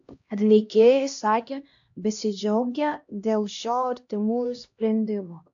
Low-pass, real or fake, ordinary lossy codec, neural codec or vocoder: 7.2 kHz; fake; AAC, 64 kbps; codec, 16 kHz, 1 kbps, X-Codec, HuBERT features, trained on LibriSpeech